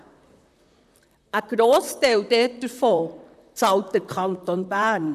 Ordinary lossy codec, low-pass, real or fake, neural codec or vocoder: none; 14.4 kHz; fake; vocoder, 44.1 kHz, 128 mel bands, Pupu-Vocoder